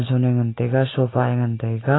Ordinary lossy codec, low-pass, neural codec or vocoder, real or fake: AAC, 16 kbps; 7.2 kHz; none; real